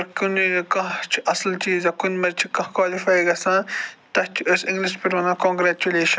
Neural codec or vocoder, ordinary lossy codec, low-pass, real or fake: none; none; none; real